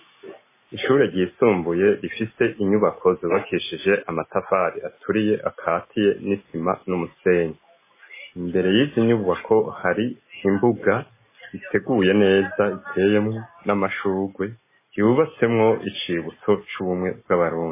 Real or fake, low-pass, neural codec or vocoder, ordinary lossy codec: real; 3.6 kHz; none; MP3, 16 kbps